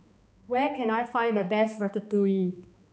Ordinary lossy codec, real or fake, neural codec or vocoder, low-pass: none; fake; codec, 16 kHz, 2 kbps, X-Codec, HuBERT features, trained on balanced general audio; none